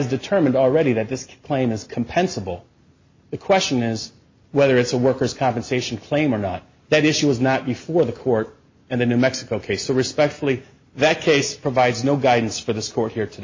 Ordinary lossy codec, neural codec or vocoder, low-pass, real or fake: MP3, 32 kbps; none; 7.2 kHz; real